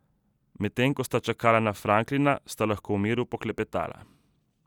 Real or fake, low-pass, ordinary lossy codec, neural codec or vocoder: real; 19.8 kHz; none; none